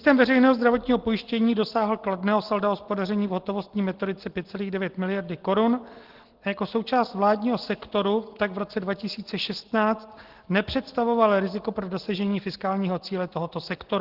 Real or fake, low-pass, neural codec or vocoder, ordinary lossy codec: real; 5.4 kHz; none; Opus, 16 kbps